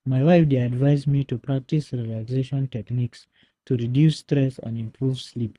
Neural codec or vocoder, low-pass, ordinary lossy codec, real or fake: codec, 24 kHz, 6 kbps, HILCodec; none; none; fake